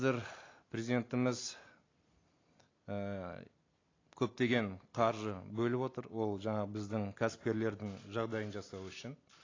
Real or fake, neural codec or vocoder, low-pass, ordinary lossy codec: real; none; 7.2 kHz; AAC, 32 kbps